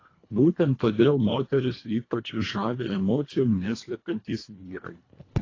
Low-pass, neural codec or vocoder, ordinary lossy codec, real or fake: 7.2 kHz; codec, 24 kHz, 1.5 kbps, HILCodec; AAC, 32 kbps; fake